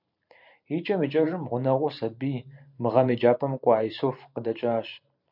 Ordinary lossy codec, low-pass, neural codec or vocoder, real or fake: MP3, 48 kbps; 5.4 kHz; vocoder, 44.1 kHz, 128 mel bands every 512 samples, BigVGAN v2; fake